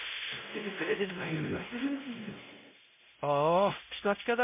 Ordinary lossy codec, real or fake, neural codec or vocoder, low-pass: MP3, 32 kbps; fake; codec, 16 kHz, 0.5 kbps, X-Codec, WavLM features, trained on Multilingual LibriSpeech; 3.6 kHz